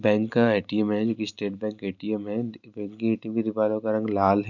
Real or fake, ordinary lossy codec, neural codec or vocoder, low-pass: real; none; none; 7.2 kHz